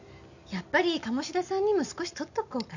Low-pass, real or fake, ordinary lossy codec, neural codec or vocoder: 7.2 kHz; real; none; none